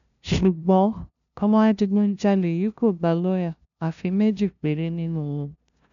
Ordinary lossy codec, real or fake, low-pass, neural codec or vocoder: none; fake; 7.2 kHz; codec, 16 kHz, 0.5 kbps, FunCodec, trained on LibriTTS, 25 frames a second